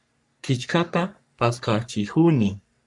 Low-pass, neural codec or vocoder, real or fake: 10.8 kHz; codec, 44.1 kHz, 3.4 kbps, Pupu-Codec; fake